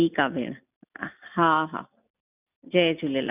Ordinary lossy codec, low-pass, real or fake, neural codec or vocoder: none; 3.6 kHz; real; none